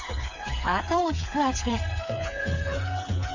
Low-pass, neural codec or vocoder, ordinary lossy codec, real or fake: 7.2 kHz; codec, 16 kHz, 4 kbps, FreqCodec, smaller model; AAC, 48 kbps; fake